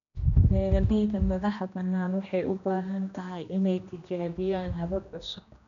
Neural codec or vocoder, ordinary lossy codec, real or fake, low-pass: codec, 16 kHz, 1 kbps, X-Codec, HuBERT features, trained on general audio; none; fake; 7.2 kHz